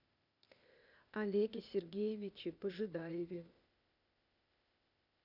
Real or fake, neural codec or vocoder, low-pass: fake; codec, 16 kHz, 0.8 kbps, ZipCodec; 5.4 kHz